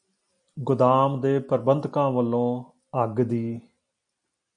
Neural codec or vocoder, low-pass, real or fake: none; 9.9 kHz; real